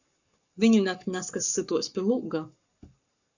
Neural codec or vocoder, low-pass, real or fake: codec, 44.1 kHz, 7.8 kbps, Pupu-Codec; 7.2 kHz; fake